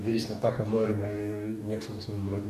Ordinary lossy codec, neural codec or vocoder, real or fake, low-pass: MP3, 96 kbps; codec, 44.1 kHz, 2.6 kbps, DAC; fake; 14.4 kHz